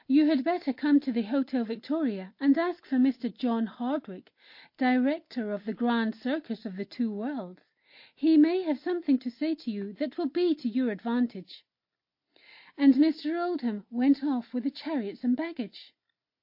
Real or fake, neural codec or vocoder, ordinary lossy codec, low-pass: real; none; MP3, 32 kbps; 5.4 kHz